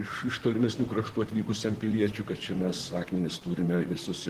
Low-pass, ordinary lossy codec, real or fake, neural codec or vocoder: 14.4 kHz; Opus, 16 kbps; fake; vocoder, 44.1 kHz, 128 mel bands, Pupu-Vocoder